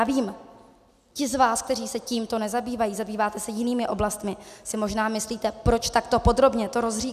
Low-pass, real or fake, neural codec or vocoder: 14.4 kHz; real; none